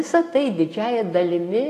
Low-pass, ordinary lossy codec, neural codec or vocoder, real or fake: 14.4 kHz; AAC, 48 kbps; none; real